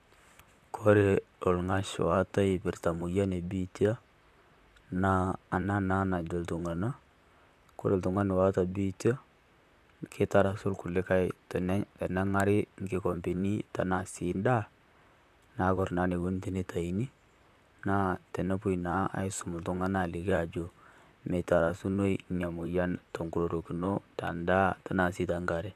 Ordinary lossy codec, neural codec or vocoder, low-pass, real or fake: AAC, 96 kbps; vocoder, 44.1 kHz, 128 mel bands, Pupu-Vocoder; 14.4 kHz; fake